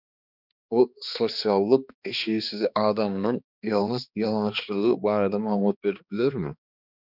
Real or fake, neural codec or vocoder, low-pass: fake; codec, 16 kHz, 2 kbps, X-Codec, HuBERT features, trained on balanced general audio; 5.4 kHz